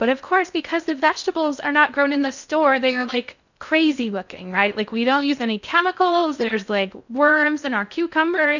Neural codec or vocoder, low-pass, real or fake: codec, 16 kHz in and 24 kHz out, 0.6 kbps, FocalCodec, streaming, 2048 codes; 7.2 kHz; fake